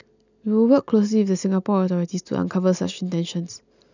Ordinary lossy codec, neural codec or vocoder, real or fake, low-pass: none; none; real; 7.2 kHz